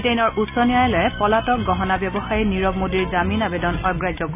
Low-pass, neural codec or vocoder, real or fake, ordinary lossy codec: 3.6 kHz; none; real; none